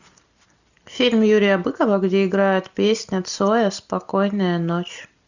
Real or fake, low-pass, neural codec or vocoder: real; 7.2 kHz; none